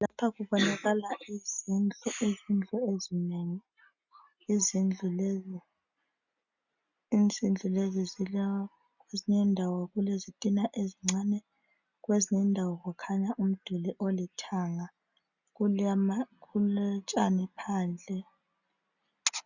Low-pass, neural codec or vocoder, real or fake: 7.2 kHz; none; real